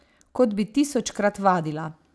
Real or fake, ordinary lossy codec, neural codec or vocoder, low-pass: real; none; none; none